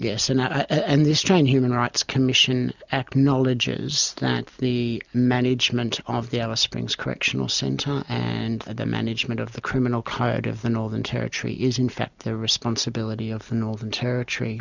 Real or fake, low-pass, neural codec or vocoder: real; 7.2 kHz; none